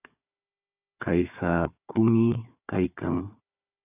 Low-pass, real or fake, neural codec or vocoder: 3.6 kHz; fake; codec, 16 kHz, 4 kbps, FunCodec, trained on Chinese and English, 50 frames a second